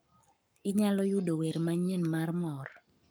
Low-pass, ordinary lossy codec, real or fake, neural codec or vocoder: none; none; fake; codec, 44.1 kHz, 7.8 kbps, Pupu-Codec